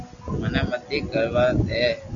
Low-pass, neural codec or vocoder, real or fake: 7.2 kHz; none; real